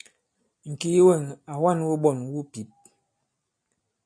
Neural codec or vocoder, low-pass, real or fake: none; 9.9 kHz; real